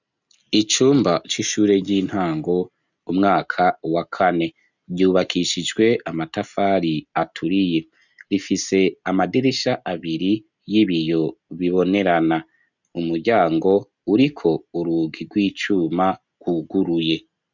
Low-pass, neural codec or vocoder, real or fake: 7.2 kHz; none; real